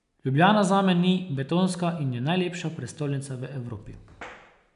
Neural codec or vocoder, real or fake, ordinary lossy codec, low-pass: none; real; none; 10.8 kHz